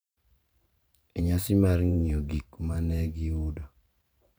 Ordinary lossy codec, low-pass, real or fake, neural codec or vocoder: none; none; fake; vocoder, 44.1 kHz, 128 mel bands every 512 samples, BigVGAN v2